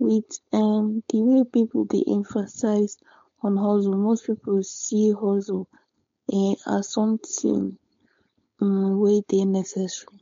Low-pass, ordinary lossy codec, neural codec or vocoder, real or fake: 7.2 kHz; MP3, 48 kbps; codec, 16 kHz, 4.8 kbps, FACodec; fake